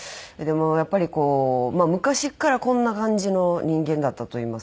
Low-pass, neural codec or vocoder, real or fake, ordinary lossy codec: none; none; real; none